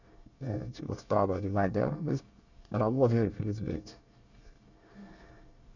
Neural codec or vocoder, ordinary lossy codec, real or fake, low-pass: codec, 24 kHz, 1 kbps, SNAC; none; fake; 7.2 kHz